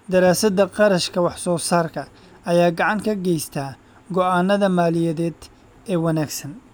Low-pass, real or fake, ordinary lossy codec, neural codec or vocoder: none; real; none; none